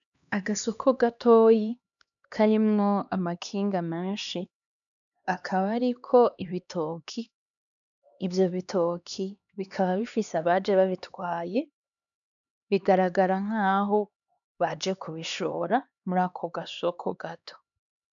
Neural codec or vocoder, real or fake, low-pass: codec, 16 kHz, 2 kbps, X-Codec, HuBERT features, trained on LibriSpeech; fake; 7.2 kHz